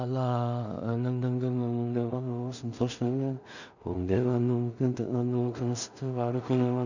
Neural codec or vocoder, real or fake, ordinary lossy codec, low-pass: codec, 16 kHz in and 24 kHz out, 0.4 kbps, LongCat-Audio-Codec, two codebook decoder; fake; none; 7.2 kHz